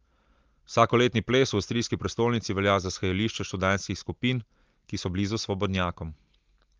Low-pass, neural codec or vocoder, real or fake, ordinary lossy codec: 7.2 kHz; none; real; Opus, 32 kbps